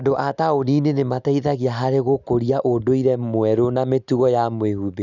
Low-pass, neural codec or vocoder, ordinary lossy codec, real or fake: 7.2 kHz; none; none; real